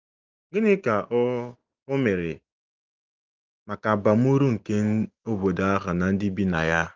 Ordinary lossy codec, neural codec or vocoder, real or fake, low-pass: Opus, 24 kbps; none; real; 7.2 kHz